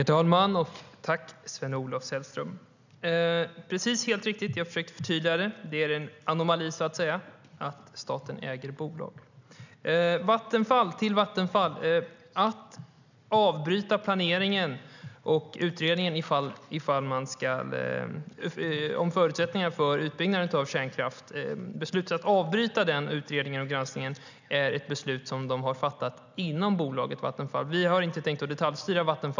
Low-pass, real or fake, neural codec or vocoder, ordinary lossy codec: 7.2 kHz; real; none; none